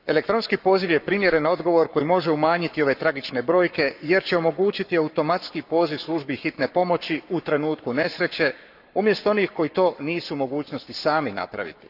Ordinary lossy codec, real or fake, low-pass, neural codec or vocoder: none; fake; 5.4 kHz; codec, 44.1 kHz, 7.8 kbps, Pupu-Codec